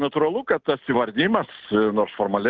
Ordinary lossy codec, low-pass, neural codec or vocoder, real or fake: Opus, 16 kbps; 7.2 kHz; none; real